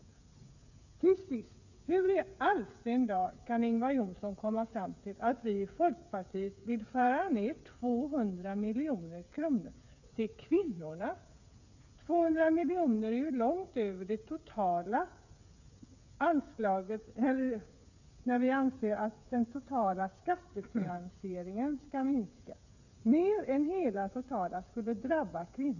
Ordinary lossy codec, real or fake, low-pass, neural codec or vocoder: none; fake; 7.2 kHz; codec, 16 kHz, 8 kbps, FreqCodec, smaller model